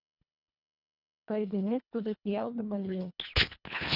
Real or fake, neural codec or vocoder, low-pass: fake; codec, 24 kHz, 1.5 kbps, HILCodec; 5.4 kHz